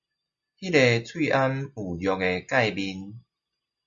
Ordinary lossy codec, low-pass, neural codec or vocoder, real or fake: Opus, 64 kbps; 7.2 kHz; none; real